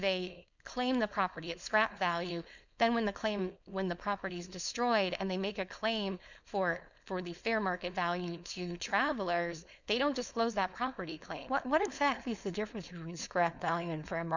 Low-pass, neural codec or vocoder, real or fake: 7.2 kHz; codec, 16 kHz, 4.8 kbps, FACodec; fake